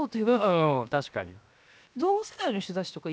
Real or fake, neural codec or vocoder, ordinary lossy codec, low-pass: fake; codec, 16 kHz, 0.7 kbps, FocalCodec; none; none